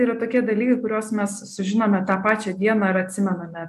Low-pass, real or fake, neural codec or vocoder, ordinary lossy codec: 14.4 kHz; real; none; AAC, 96 kbps